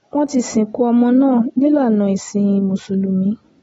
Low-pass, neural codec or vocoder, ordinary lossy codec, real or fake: 19.8 kHz; none; AAC, 24 kbps; real